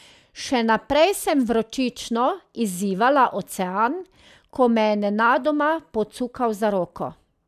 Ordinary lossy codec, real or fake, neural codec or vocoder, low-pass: none; real; none; 14.4 kHz